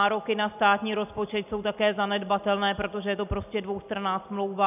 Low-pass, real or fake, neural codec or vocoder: 3.6 kHz; real; none